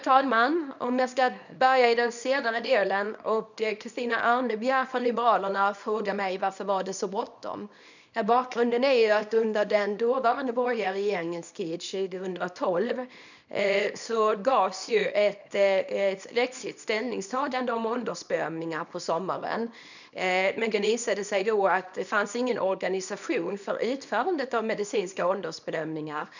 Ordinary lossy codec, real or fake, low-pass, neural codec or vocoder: none; fake; 7.2 kHz; codec, 24 kHz, 0.9 kbps, WavTokenizer, small release